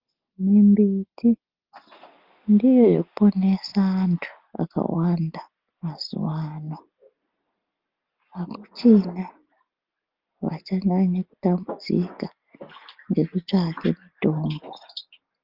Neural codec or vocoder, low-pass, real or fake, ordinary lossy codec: none; 5.4 kHz; real; Opus, 32 kbps